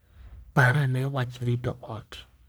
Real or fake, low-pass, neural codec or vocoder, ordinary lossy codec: fake; none; codec, 44.1 kHz, 1.7 kbps, Pupu-Codec; none